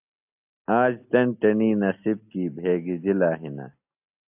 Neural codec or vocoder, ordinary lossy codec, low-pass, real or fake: none; AAC, 32 kbps; 3.6 kHz; real